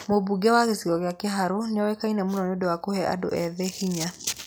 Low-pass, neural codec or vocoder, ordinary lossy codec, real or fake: none; none; none; real